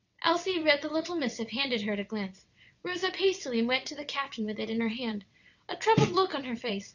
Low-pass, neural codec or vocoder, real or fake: 7.2 kHz; vocoder, 22.05 kHz, 80 mel bands, WaveNeXt; fake